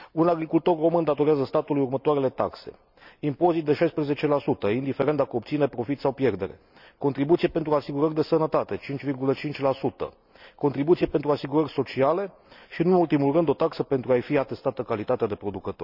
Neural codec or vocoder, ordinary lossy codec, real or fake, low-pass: none; none; real; 5.4 kHz